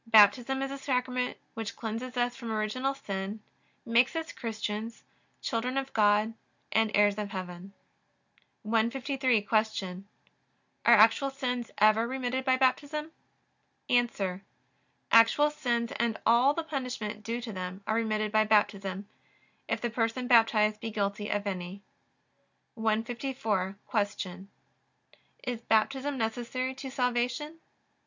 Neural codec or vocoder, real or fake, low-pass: none; real; 7.2 kHz